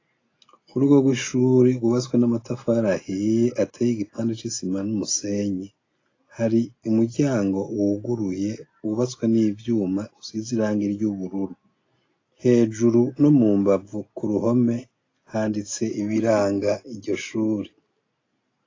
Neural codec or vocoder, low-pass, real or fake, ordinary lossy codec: none; 7.2 kHz; real; AAC, 32 kbps